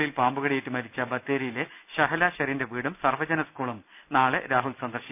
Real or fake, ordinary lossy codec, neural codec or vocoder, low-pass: real; none; none; 3.6 kHz